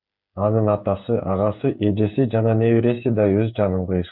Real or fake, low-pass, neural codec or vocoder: fake; 5.4 kHz; codec, 16 kHz, 8 kbps, FreqCodec, smaller model